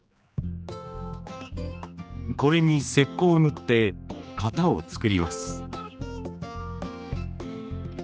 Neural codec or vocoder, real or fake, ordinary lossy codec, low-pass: codec, 16 kHz, 2 kbps, X-Codec, HuBERT features, trained on general audio; fake; none; none